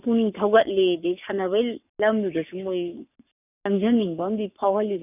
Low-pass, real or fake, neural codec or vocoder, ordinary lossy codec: 3.6 kHz; fake; codec, 44.1 kHz, 7.8 kbps, Pupu-Codec; none